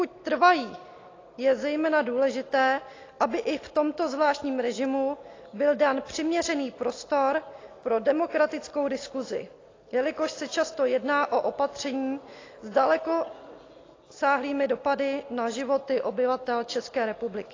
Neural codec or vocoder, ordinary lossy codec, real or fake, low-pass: none; AAC, 32 kbps; real; 7.2 kHz